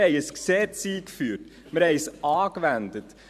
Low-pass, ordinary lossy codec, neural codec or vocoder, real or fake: 14.4 kHz; none; vocoder, 44.1 kHz, 128 mel bands every 512 samples, BigVGAN v2; fake